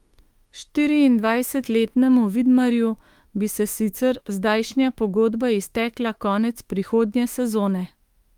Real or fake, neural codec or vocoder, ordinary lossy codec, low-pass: fake; autoencoder, 48 kHz, 32 numbers a frame, DAC-VAE, trained on Japanese speech; Opus, 32 kbps; 19.8 kHz